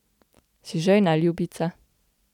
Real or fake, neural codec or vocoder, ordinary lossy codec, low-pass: real; none; none; 19.8 kHz